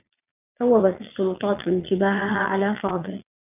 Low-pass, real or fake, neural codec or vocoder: 3.6 kHz; fake; vocoder, 22.05 kHz, 80 mel bands, Vocos